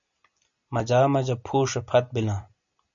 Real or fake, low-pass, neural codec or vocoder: real; 7.2 kHz; none